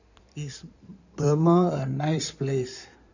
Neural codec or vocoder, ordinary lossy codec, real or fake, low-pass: codec, 16 kHz in and 24 kHz out, 2.2 kbps, FireRedTTS-2 codec; none; fake; 7.2 kHz